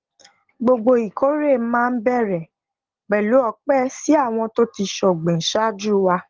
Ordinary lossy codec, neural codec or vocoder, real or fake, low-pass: Opus, 16 kbps; none; real; 7.2 kHz